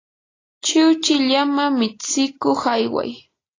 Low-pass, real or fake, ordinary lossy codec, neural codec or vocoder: 7.2 kHz; real; AAC, 32 kbps; none